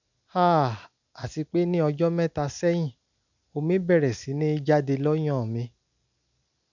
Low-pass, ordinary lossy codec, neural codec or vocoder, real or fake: 7.2 kHz; none; none; real